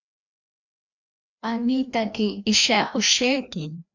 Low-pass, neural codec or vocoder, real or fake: 7.2 kHz; codec, 16 kHz, 1 kbps, FreqCodec, larger model; fake